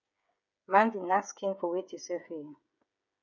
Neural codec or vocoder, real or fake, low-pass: codec, 16 kHz, 16 kbps, FreqCodec, smaller model; fake; 7.2 kHz